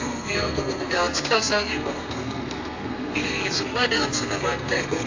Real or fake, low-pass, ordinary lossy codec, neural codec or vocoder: fake; 7.2 kHz; AAC, 48 kbps; codec, 32 kHz, 1.9 kbps, SNAC